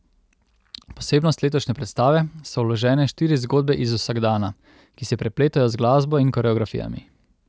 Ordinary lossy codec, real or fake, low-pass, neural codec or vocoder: none; real; none; none